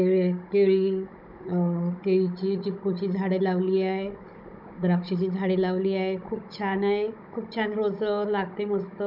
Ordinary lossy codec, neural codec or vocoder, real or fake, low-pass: none; codec, 16 kHz, 16 kbps, FunCodec, trained on Chinese and English, 50 frames a second; fake; 5.4 kHz